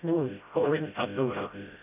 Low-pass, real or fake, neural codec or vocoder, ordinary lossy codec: 3.6 kHz; fake; codec, 16 kHz, 0.5 kbps, FreqCodec, smaller model; none